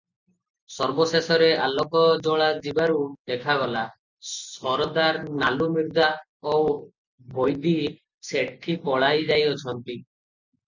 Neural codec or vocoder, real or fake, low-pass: none; real; 7.2 kHz